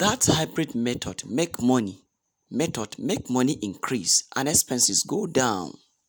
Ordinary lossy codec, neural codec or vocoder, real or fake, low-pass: none; none; real; none